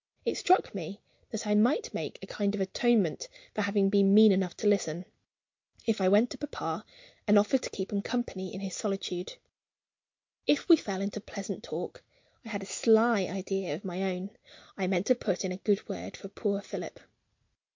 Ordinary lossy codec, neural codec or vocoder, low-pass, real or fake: MP3, 48 kbps; none; 7.2 kHz; real